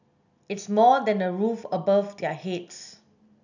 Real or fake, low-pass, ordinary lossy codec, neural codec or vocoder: real; 7.2 kHz; none; none